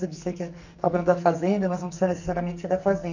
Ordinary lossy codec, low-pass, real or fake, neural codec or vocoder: none; 7.2 kHz; fake; codec, 44.1 kHz, 2.6 kbps, SNAC